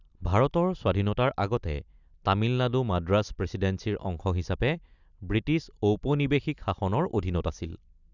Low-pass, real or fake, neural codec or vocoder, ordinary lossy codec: 7.2 kHz; real; none; none